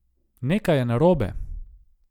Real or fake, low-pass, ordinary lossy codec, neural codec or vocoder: real; 19.8 kHz; none; none